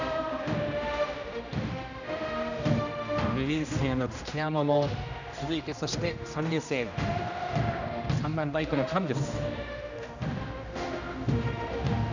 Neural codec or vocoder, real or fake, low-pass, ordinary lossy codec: codec, 16 kHz, 1 kbps, X-Codec, HuBERT features, trained on general audio; fake; 7.2 kHz; none